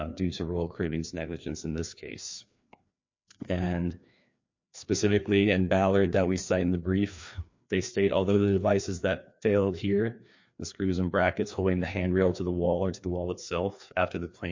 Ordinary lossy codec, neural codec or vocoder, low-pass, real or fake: MP3, 48 kbps; codec, 16 kHz, 2 kbps, FreqCodec, larger model; 7.2 kHz; fake